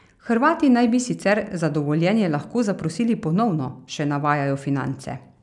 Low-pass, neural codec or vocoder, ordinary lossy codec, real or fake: 10.8 kHz; none; none; real